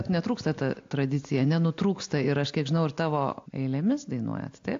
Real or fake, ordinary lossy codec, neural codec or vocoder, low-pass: real; AAC, 48 kbps; none; 7.2 kHz